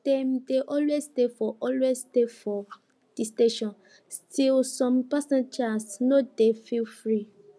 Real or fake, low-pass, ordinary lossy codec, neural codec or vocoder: real; none; none; none